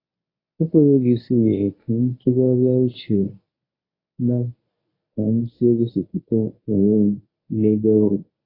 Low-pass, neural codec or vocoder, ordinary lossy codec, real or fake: 5.4 kHz; codec, 24 kHz, 0.9 kbps, WavTokenizer, medium speech release version 1; AAC, 24 kbps; fake